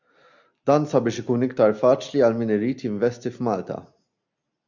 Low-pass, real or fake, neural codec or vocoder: 7.2 kHz; real; none